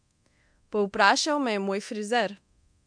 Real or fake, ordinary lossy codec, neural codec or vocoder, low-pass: fake; MP3, 64 kbps; codec, 24 kHz, 0.9 kbps, DualCodec; 9.9 kHz